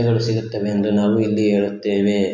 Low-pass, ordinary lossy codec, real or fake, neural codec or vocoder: 7.2 kHz; MP3, 48 kbps; real; none